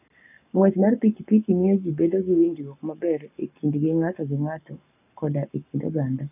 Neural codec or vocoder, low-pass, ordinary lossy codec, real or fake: codec, 44.1 kHz, 7.8 kbps, Pupu-Codec; 3.6 kHz; none; fake